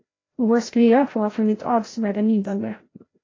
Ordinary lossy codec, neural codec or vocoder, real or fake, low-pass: AAC, 32 kbps; codec, 16 kHz, 0.5 kbps, FreqCodec, larger model; fake; 7.2 kHz